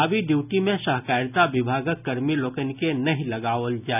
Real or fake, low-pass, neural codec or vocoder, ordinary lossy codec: real; 3.6 kHz; none; none